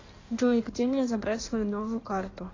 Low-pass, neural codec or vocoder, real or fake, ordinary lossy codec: 7.2 kHz; codec, 16 kHz in and 24 kHz out, 1.1 kbps, FireRedTTS-2 codec; fake; AAC, 32 kbps